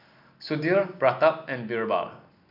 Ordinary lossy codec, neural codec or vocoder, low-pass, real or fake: MP3, 48 kbps; none; 5.4 kHz; real